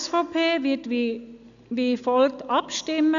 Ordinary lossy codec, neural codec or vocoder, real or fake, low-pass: none; none; real; 7.2 kHz